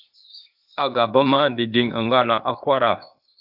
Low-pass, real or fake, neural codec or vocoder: 5.4 kHz; fake; codec, 16 kHz, 0.8 kbps, ZipCodec